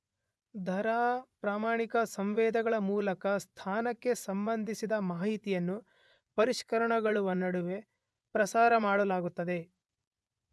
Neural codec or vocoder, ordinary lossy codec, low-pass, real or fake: vocoder, 24 kHz, 100 mel bands, Vocos; none; none; fake